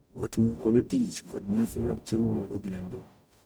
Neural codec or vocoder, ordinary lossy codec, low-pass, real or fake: codec, 44.1 kHz, 0.9 kbps, DAC; none; none; fake